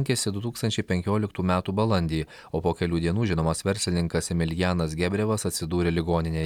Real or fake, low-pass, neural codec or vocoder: real; 19.8 kHz; none